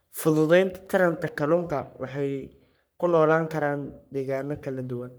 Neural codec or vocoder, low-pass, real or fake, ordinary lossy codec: codec, 44.1 kHz, 3.4 kbps, Pupu-Codec; none; fake; none